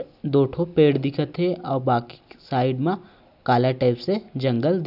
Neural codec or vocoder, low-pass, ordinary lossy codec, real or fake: none; 5.4 kHz; none; real